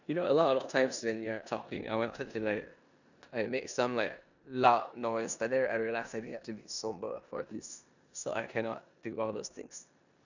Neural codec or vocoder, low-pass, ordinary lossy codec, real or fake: codec, 16 kHz in and 24 kHz out, 0.9 kbps, LongCat-Audio-Codec, four codebook decoder; 7.2 kHz; none; fake